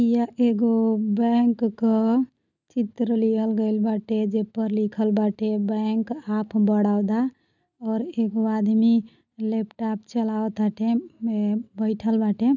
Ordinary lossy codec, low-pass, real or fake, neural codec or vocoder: none; 7.2 kHz; real; none